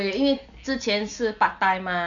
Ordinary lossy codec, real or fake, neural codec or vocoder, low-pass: none; real; none; 7.2 kHz